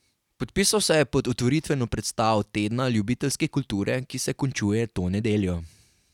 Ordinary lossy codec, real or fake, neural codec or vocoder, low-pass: none; real; none; 19.8 kHz